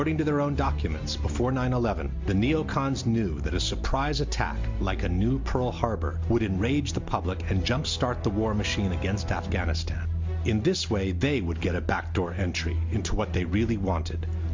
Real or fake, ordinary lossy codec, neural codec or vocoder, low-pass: real; MP3, 48 kbps; none; 7.2 kHz